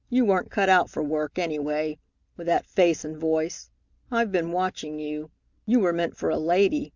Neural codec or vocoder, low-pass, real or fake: none; 7.2 kHz; real